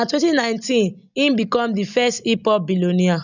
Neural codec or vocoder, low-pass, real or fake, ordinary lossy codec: none; 7.2 kHz; real; none